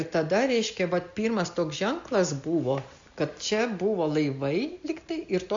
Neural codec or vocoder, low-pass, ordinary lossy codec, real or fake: none; 7.2 kHz; AAC, 64 kbps; real